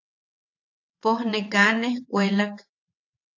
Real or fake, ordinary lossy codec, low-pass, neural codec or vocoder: fake; AAC, 48 kbps; 7.2 kHz; vocoder, 22.05 kHz, 80 mel bands, WaveNeXt